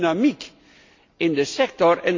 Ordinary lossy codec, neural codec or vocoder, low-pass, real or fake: MP3, 48 kbps; none; 7.2 kHz; real